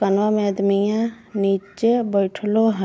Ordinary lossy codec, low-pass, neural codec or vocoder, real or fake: none; none; none; real